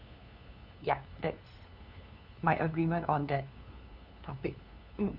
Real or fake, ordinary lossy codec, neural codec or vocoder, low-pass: fake; none; codec, 16 kHz, 2 kbps, FunCodec, trained on LibriTTS, 25 frames a second; 5.4 kHz